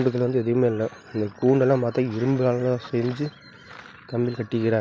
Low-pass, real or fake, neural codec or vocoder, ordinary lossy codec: none; real; none; none